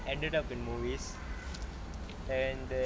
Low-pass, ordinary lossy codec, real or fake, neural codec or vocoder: none; none; real; none